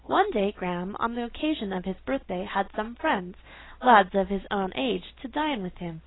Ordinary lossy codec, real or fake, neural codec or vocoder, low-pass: AAC, 16 kbps; real; none; 7.2 kHz